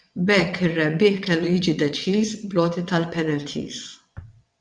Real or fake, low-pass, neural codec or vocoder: fake; 9.9 kHz; vocoder, 22.05 kHz, 80 mel bands, WaveNeXt